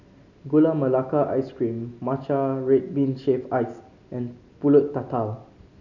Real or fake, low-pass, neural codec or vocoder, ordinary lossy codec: real; 7.2 kHz; none; none